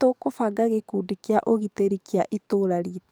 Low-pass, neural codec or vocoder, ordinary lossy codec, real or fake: none; codec, 44.1 kHz, 7.8 kbps, DAC; none; fake